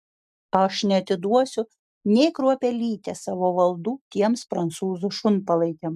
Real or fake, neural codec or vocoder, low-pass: real; none; 14.4 kHz